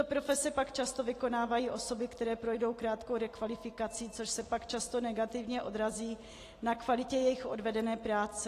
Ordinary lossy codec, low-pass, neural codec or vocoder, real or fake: AAC, 48 kbps; 14.4 kHz; none; real